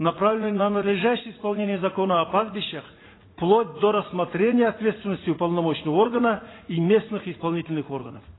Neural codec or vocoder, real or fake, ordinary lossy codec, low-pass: vocoder, 44.1 kHz, 80 mel bands, Vocos; fake; AAC, 16 kbps; 7.2 kHz